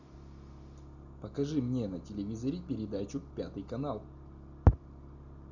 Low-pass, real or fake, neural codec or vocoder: 7.2 kHz; real; none